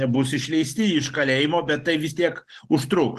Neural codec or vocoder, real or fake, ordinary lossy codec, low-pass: none; real; Opus, 16 kbps; 14.4 kHz